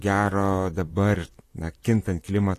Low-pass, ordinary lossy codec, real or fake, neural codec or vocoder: 14.4 kHz; AAC, 48 kbps; fake; vocoder, 44.1 kHz, 128 mel bands, Pupu-Vocoder